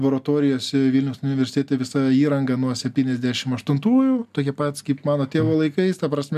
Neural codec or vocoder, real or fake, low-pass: none; real; 14.4 kHz